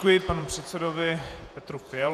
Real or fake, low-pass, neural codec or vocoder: fake; 14.4 kHz; vocoder, 48 kHz, 128 mel bands, Vocos